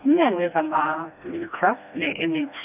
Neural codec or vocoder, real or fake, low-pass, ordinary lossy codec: codec, 16 kHz, 1 kbps, FreqCodec, smaller model; fake; 3.6 kHz; none